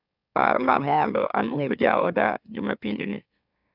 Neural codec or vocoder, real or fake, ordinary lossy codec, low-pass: autoencoder, 44.1 kHz, a latent of 192 numbers a frame, MeloTTS; fake; none; 5.4 kHz